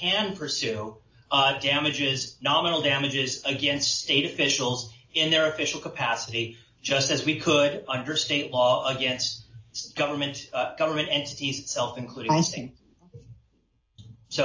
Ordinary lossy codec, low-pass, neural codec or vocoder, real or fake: AAC, 48 kbps; 7.2 kHz; none; real